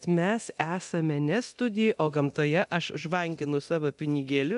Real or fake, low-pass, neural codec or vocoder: fake; 10.8 kHz; codec, 24 kHz, 0.9 kbps, DualCodec